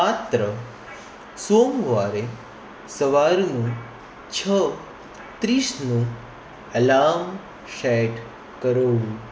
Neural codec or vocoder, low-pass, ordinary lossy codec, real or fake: none; none; none; real